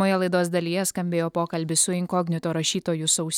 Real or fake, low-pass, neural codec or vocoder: real; 19.8 kHz; none